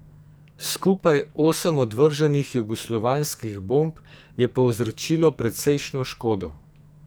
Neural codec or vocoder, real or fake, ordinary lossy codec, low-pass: codec, 44.1 kHz, 2.6 kbps, SNAC; fake; none; none